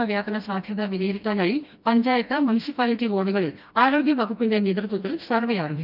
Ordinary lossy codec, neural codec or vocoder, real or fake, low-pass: none; codec, 16 kHz, 1 kbps, FreqCodec, smaller model; fake; 5.4 kHz